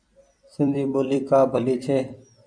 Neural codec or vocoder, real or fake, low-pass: vocoder, 44.1 kHz, 128 mel bands every 256 samples, BigVGAN v2; fake; 9.9 kHz